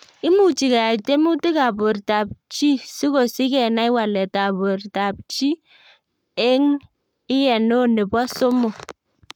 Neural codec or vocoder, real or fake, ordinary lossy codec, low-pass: codec, 44.1 kHz, 7.8 kbps, Pupu-Codec; fake; none; 19.8 kHz